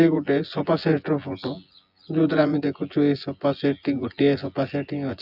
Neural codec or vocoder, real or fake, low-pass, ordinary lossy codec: vocoder, 24 kHz, 100 mel bands, Vocos; fake; 5.4 kHz; AAC, 48 kbps